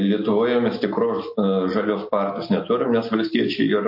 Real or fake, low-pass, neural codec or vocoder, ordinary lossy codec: real; 5.4 kHz; none; MP3, 32 kbps